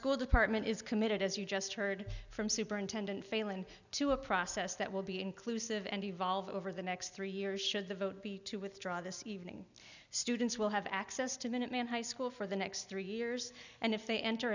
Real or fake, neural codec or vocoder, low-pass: real; none; 7.2 kHz